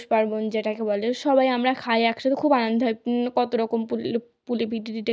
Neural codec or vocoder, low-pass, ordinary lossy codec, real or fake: none; none; none; real